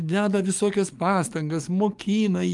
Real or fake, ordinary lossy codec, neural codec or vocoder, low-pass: fake; Opus, 32 kbps; codec, 44.1 kHz, 7.8 kbps, DAC; 10.8 kHz